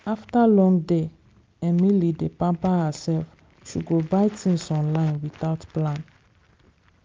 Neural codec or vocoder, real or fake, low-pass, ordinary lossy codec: none; real; 7.2 kHz; Opus, 24 kbps